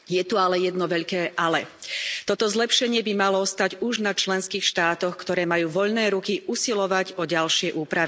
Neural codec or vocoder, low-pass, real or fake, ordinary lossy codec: none; none; real; none